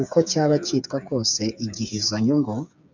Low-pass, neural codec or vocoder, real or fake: 7.2 kHz; codec, 16 kHz, 8 kbps, FreqCodec, smaller model; fake